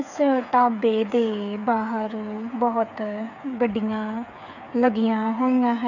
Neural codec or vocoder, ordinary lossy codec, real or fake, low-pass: codec, 16 kHz, 8 kbps, FreqCodec, smaller model; none; fake; 7.2 kHz